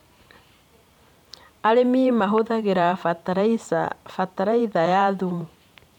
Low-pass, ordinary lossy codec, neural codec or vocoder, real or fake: 19.8 kHz; none; vocoder, 44.1 kHz, 128 mel bands every 512 samples, BigVGAN v2; fake